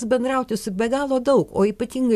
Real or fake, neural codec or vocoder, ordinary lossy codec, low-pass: real; none; AAC, 96 kbps; 14.4 kHz